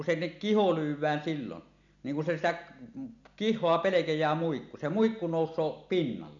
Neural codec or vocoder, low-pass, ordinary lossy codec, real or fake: none; 7.2 kHz; none; real